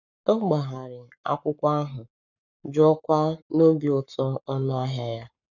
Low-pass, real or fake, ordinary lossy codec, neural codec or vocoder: 7.2 kHz; fake; none; codec, 44.1 kHz, 7.8 kbps, Pupu-Codec